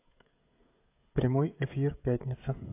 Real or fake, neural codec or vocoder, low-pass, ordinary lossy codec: fake; codec, 16 kHz, 16 kbps, FreqCodec, smaller model; 3.6 kHz; AAC, 24 kbps